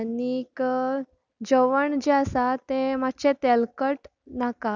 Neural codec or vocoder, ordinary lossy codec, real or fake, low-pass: none; none; real; 7.2 kHz